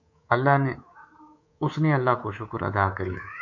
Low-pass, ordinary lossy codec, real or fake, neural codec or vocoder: 7.2 kHz; MP3, 48 kbps; fake; codec, 24 kHz, 3.1 kbps, DualCodec